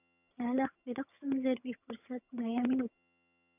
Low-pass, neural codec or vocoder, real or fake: 3.6 kHz; vocoder, 22.05 kHz, 80 mel bands, HiFi-GAN; fake